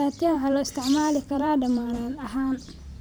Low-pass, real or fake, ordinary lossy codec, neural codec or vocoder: none; fake; none; vocoder, 44.1 kHz, 128 mel bands every 512 samples, BigVGAN v2